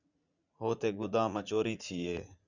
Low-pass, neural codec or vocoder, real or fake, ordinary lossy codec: 7.2 kHz; vocoder, 44.1 kHz, 80 mel bands, Vocos; fake; Opus, 64 kbps